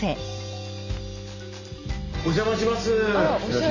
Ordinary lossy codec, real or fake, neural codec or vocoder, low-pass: none; real; none; 7.2 kHz